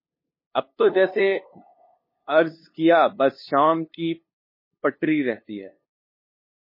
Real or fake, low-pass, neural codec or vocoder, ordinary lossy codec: fake; 5.4 kHz; codec, 16 kHz, 2 kbps, FunCodec, trained on LibriTTS, 25 frames a second; MP3, 24 kbps